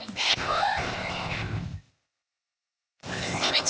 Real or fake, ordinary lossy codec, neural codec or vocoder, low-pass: fake; none; codec, 16 kHz, 0.8 kbps, ZipCodec; none